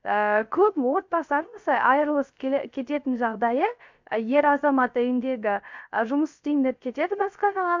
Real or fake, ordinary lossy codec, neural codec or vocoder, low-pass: fake; MP3, 64 kbps; codec, 16 kHz, 0.3 kbps, FocalCodec; 7.2 kHz